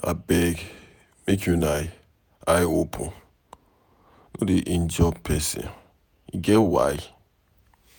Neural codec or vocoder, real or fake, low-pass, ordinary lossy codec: vocoder, 48 kHz, 128 mel bands, Vocos; fake; none; none